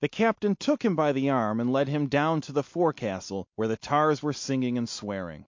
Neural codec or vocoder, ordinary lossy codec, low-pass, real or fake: none; MP3, 48 kbps; 7.2 kHz; real